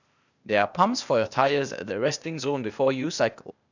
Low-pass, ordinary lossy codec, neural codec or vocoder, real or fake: 7.2 kHz; none; codec, 16 kHz, 0.8 kbps, ZipCodec; fake